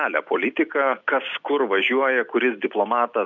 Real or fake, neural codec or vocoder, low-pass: real; none; 7.2 kHz